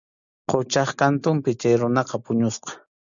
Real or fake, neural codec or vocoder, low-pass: real; none; 7.2 kHz